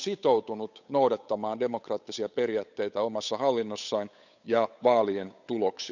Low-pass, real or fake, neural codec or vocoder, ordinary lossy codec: 7.2 kHz; fake; codec, 16 kHz, 8 kbps, FunCodec, trained on Chinese and English, 25 frames a second; none